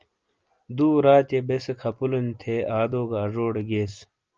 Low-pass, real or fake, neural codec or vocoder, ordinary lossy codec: 7.2 kHz; real; none; Opus, 24 kbps